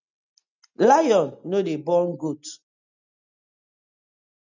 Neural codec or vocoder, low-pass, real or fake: none; 7.2 kHz; real